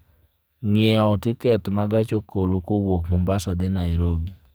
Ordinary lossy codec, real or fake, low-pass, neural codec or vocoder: none; fake; none; codec, 44.1 kHz, 2.6 kbps, SNAC